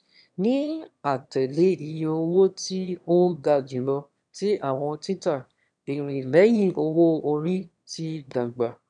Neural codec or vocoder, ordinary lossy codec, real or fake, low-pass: autoencoder, 22.05 kHz, a latent of 192 numbers a frame, VITS, trained on one speaker; none; fake; 9.9 kHz